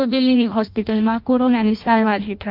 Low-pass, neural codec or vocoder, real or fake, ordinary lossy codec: 5.4 kHz; codec, 16 kHz in and 24 kHz out, 0.6 kbps, FireRedTTS-2 codec; fake; Opus, 24 kbps